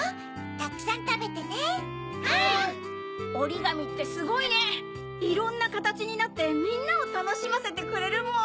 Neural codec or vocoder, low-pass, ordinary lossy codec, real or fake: none; none; none; real